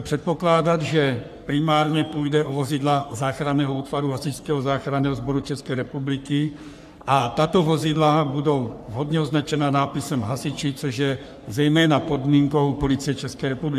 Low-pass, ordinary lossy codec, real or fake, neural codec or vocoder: 14.4 kHz; AAC, 96 kbps; fake; codec, 44.1 kHz, 3.4 kbps, Pupu-Codec